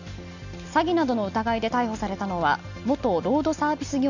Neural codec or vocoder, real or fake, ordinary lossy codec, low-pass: none; real; none; 7.2 kHz